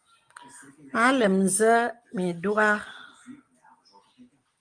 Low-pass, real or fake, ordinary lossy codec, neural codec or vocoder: 9.9 kHz; real; Opus, 32 kbps; none